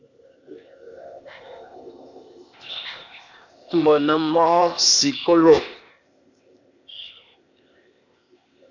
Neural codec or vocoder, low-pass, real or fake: codec, 16 kHz, 0.8 kbps, ZipCodec; 7.2 kHz; fake